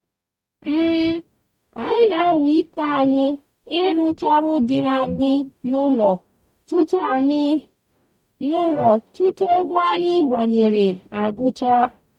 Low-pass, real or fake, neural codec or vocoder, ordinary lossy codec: 19.8 kHz; fake; codec, 44.1 kHz, 0.9 kbps, DAC; MP3, 96 kbps